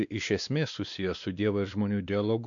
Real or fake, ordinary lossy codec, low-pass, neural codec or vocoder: fake; MP3, 96 kbps; 7.2 kHz; codec, 16 kHz, 2 kbps, X-Codec, WavLM features, trained on Multilingual LibriSpeech